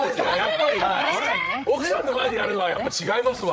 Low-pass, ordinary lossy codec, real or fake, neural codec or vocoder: none; none; fake; codec, 16 kHz, 8 kbps, FreqCodec, larger model